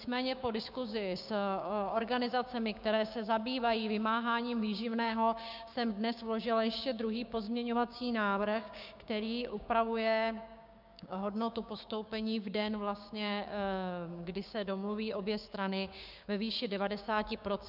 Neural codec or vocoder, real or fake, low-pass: codec, 16 kHz, 6 kbps, DAC; fake; 5.4 kHz